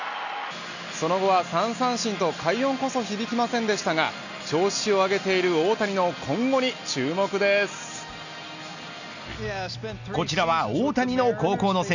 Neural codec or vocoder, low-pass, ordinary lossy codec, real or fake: none; 7.2 kHz; none; real